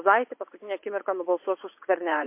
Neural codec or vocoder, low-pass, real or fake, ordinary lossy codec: vocoder, 44.1 kHz, 80 mel bands, Vocos; 3.6 kHz; fake; MP3, 24 kbps